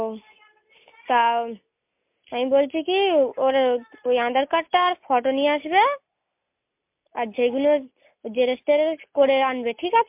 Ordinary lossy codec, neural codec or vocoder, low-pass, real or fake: MP3, 32 kbps; none; 3.6 kHz; real